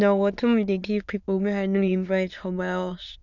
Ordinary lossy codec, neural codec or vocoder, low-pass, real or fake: none; autoencoder, 22.05 kHz, a latent of 192 numbers a frame, VITS, trained on many speakers; 7.2 kHz; fake